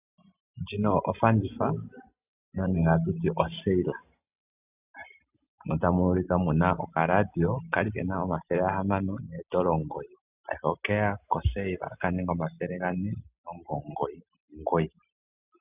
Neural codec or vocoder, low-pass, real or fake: none; 3.6 kHz; real